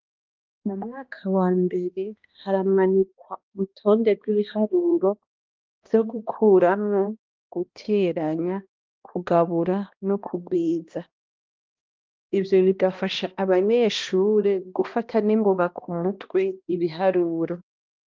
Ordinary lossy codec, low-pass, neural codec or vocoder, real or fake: Opus, 32 kbps; 7.2 kHz; codec, 16 kHz, 1 kbps, X-Codec, HuBERT features, trained on balanced general audio; fake